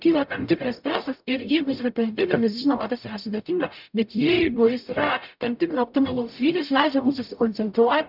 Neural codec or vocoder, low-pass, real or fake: codec, 44.1 kHz, 0.9 kbps, DAC; 5.4 kHz; fake